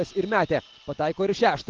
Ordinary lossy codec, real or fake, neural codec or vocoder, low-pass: Opus, 16 kbps; real; none; 7.2 kHz